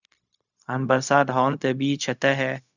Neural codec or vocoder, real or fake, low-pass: codec, 16 kHz, 0.4 kbps, LongCat-Audio-Codec; fake; 7.2 kHz